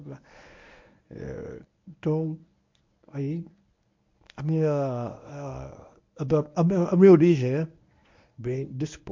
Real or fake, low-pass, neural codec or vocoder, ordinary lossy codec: fake; 7.2 kHz; codec, 24 kHz, 0.9 kbps, WavTokenizer, medium speech release version 1; MP3, 48 kbps